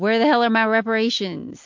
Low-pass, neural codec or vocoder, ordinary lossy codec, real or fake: 7.2 kHz; none; MP3, 48 kbps; real